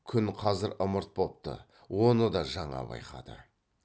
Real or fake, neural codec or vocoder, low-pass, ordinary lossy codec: real; none; none; none